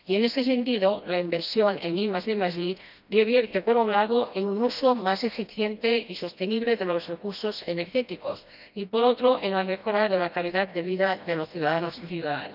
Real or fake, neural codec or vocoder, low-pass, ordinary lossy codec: fake; codec, 16 kHz, 1 kbps, FreqCodec, smaller model; 5.4 kHz; none